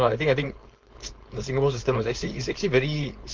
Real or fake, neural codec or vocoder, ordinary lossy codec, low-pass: fake; codec, 16 kHz, 4.8 kbps, FACodec; Opus, 24 kbps; 7.2 kHz